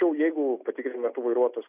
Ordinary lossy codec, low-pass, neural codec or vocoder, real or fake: AAC, 32 kbps; 3.6 kHz; none; real